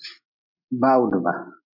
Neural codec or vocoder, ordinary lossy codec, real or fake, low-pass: none; MP3, 48 kbps; real; 5.4 kHz